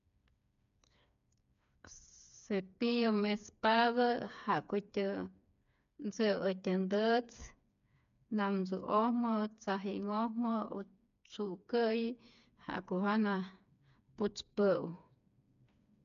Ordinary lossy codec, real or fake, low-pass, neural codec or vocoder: MP3, 64 kbps; fake; 7.2 kHz; codec, 16 kHz, 4 kbps, FreqCodec, smaller model